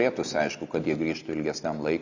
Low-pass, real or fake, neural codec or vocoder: 7.2 kHz; real; none